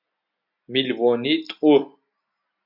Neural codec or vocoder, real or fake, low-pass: none; real; 5.4 kHz